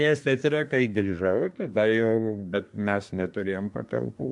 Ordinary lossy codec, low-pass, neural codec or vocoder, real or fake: MP3, 64 kbps; 10.8 kHz; codec, 24 kHz, 1 kbps, SNAC; fake